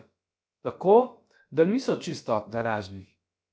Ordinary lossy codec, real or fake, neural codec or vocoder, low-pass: none; fake; codec, 16 kHz, about 1 kbps, DyCAST, with the encoder's durations; none